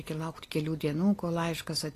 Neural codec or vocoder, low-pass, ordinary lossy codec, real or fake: none; 14.4 kHz; AAC, 48 kbps; real